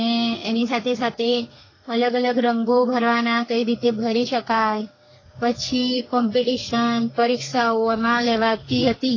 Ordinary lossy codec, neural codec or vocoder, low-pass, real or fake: AAC, 32 kbps; codec, 32 kHz, 1.9 kbps, SNAC; 7.2 kHz; fake